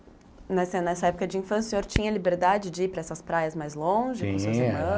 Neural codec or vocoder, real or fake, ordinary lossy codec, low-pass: none; real; none; none